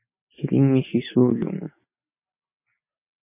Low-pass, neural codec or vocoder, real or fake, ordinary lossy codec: 3.6 kHz; none; real; MP3, 24 kbps